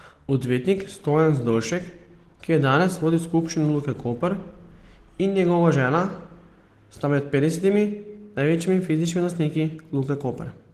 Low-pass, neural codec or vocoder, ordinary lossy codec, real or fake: 14.4 kHz; none; Opus, 16 kbps; real